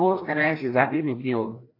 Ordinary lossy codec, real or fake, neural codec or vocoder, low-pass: AAC, 32 kbps; fake; codec, 16 kHz, 1 kbps, FreqCodec, larger model; 5.4 kHz